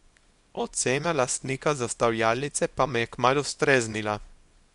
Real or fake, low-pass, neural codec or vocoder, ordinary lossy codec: fake; 10.8 kHz; codec, 24 kHz, 0.9 kbps, WavTokenizer, small release; MP3, 64 kbps